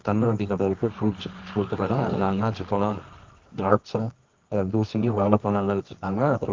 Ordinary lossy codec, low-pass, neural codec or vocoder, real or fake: Opus, 24 kbps; 7.2 kHz; codec, 24 kHz, 0.9 kbps, WavTokenizer, medium music audio release; fake